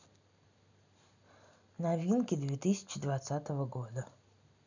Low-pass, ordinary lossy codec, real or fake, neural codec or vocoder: 7.2 kHz; none; real; none